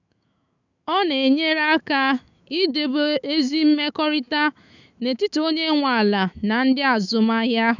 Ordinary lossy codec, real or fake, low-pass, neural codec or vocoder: none; fake; 7.2 kHz; autoencoder, 48 kHz, 128 numbers a frame, DAC-VAE, trained on Japanese speech